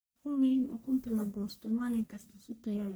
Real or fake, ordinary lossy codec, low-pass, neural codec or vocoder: fake; none; none; codec, 44.1 kHz, 1.7 kbps, Pupu-Codec